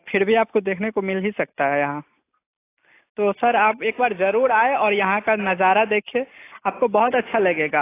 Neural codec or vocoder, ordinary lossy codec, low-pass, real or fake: none; AAC, 24 kbps; 3.6 kHz; real